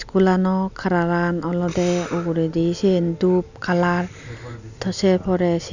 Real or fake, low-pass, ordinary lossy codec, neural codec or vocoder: real; 7.2 kHz; none; none